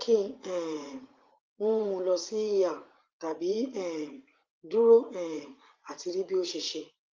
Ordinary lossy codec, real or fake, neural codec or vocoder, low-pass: Opus, 24 kbps; fake; vocoder, 44.1 kHz, 80 mel bands, Vocos; 7.2 kHz